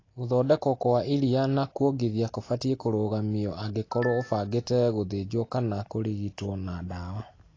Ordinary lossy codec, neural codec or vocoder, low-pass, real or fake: AAC, 32 kbps; none; 7.2 kHz; real